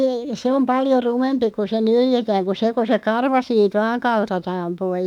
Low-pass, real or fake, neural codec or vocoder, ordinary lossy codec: 19.8 kHz; fake; autoencoder, 48 kHz, 32 numbers a frame, DAC-VAE, trained on Japanese speech; none